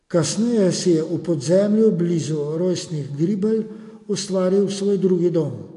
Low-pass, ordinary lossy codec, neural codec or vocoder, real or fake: 10.8 kHz; AAC, 48 kbps; none; real